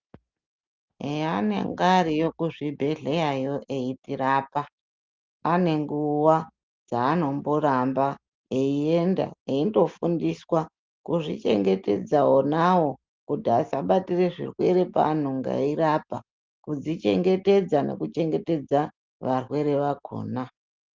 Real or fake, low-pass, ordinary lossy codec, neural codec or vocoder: real; 7.2 kHz; Opus, 24 kbps; none